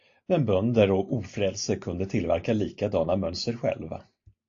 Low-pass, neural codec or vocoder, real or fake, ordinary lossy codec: 7.2 kHz; none; real; AAC, 48 kbps